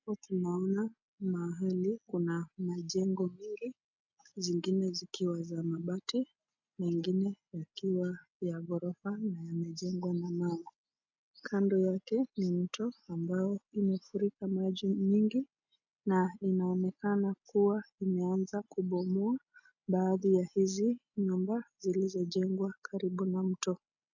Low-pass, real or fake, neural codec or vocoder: 7.2 kHz; real; none